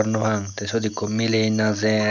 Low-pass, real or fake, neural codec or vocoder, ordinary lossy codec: 7.2 kHz; real; none; none